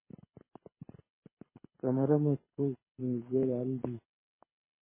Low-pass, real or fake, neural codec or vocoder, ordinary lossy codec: 3.6 kHz; fake; codec, 24 kHz, 6 kbps, HILCodec; MP3, 16 kbps